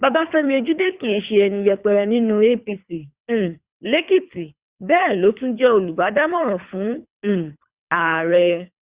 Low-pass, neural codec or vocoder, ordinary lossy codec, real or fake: 3.6 kHz; codec, 24 kHz, 3 kbps, HILCodec; Opus, 64 kbps; fake